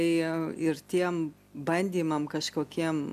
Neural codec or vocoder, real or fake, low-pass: none; real; 14.4 kHz